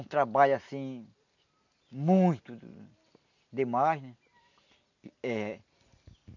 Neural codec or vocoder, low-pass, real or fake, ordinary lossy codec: none; 7.2 kHz; real; none